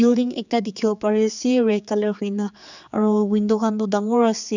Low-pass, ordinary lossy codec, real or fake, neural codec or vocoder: 7.2 kHz; none; fake; codec, 16 kHz, 4 kbps, X-Codec, HuBERT features, trained on general audio